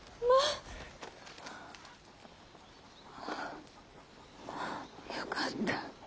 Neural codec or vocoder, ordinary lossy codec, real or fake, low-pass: none; none; real; none